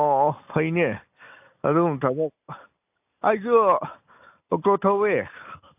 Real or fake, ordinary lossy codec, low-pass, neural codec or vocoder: real; AAC, 32 kbps; 3.6 kHz; none